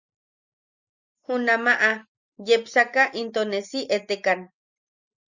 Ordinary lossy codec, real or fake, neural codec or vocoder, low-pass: Opus, 64 kbps; real; none; 7.2 kHz